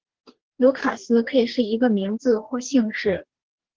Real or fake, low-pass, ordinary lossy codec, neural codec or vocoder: fake; 7.2 kHz; Opus, 16 kbps; codec, 44.1 kHz, 2.6 kbps, DAC